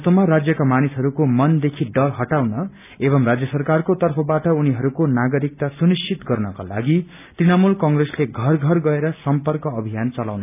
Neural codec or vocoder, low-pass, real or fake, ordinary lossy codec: none; 3.6 kHz; real; none